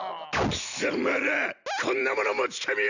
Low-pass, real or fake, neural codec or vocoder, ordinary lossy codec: 7.2 kHz; real; none; none